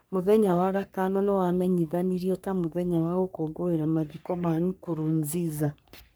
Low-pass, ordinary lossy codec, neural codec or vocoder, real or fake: none; none; codec, 44.1 kHz, 3.4 kbps, Pupu-Codec; fake